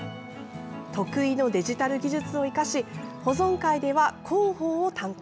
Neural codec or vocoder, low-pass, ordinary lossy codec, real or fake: none; none; none; real